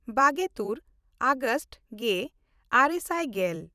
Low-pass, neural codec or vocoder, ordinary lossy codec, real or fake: 14.4 kHz; vocoder, 44.1 kHz, 128 mel bands every 256 samples, BigVGAN v2; none; fake